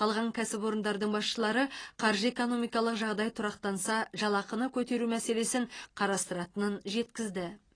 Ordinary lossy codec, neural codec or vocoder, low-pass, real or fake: AAC, 32 kbps; none; 9.9 kHz; real